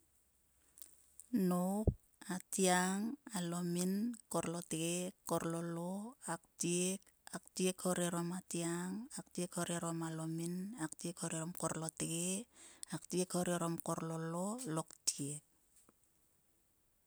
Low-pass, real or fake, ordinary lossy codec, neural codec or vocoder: none; real; none; none